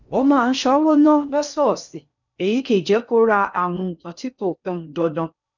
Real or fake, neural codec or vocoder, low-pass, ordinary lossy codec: fake; codec, 16 kHz in and 24 kHz out, 0.6 kbps, FocalCodec, streaming, 4096 codes; 7.2 kHz; none